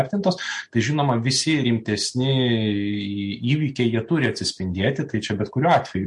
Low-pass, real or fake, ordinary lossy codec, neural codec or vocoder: 10.8 kHz; real; MP3, 48 kbps; none